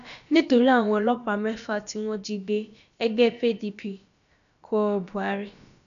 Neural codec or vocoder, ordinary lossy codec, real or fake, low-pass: codec, 16 kHz, about 1 kbps, DyCAST, with the encoder's durations; none; fake; 7.2 kHz